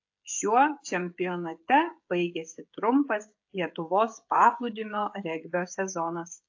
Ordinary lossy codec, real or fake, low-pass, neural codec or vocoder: AAC, 48 kbps; fake; 7.2 kHz; codec, 16 kHz, 16 kbps, FreqCodec, smaller model